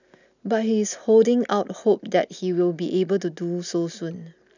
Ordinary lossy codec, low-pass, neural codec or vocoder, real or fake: none; 7.2 kHz; none; real